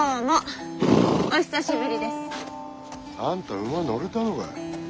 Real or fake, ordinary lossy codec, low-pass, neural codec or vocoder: real; none; none; none